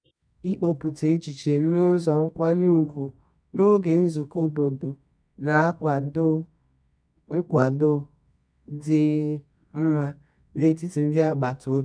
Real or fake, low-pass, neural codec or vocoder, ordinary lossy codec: fake; 9.9 kHz; codec, 24 kHz, 0.9 kbps, WavTokenizer, medium music audio release; none